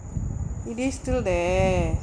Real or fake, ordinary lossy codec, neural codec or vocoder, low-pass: real; none; none; 9.9 kHz